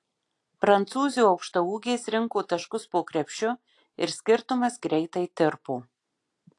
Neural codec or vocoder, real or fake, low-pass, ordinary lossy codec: none; real; 10.8 kHz; AAC, 48 kbps